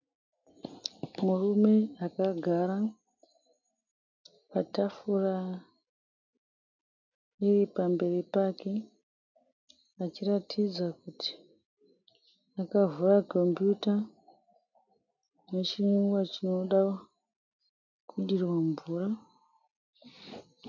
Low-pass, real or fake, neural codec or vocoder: 7.2 kHz; real; none